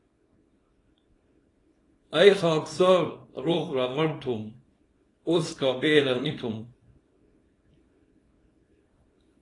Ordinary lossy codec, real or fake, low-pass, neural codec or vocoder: AAC, 32 kbps; fake; 10.8 kHz; codec, 24 kHz, 0.9 kbps, WavTokenizer, small release